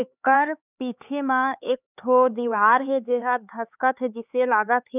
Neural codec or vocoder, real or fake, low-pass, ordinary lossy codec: codec, 16 kHz, 4 kbps, X-Codec, HuBERT features, trained on LibriSpeech; fake; 3.6 kHz; none